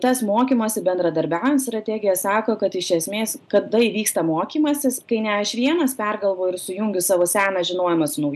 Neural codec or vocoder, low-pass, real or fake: none; 14.4 kHz; real